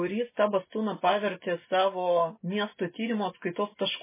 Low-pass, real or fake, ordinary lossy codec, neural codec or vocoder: 3.6 kHz; real; MP3, 16 kbps; none